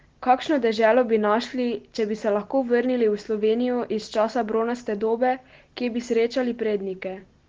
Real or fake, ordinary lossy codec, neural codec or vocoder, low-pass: real; Opus, 16 kbps; none; 7.2 kHz